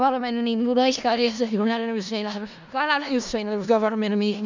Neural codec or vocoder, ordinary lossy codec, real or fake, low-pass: codec, 16 kHz in and 24 kHz out, 0.4 kbps, LongCat-Audio-Codec, four codebook decoder; none; fake; 7.2 kHz